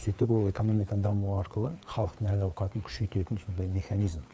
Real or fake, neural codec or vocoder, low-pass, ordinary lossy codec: fake; codec, 16 kHz, 4 kbps, FreqCodec, larger model; none; none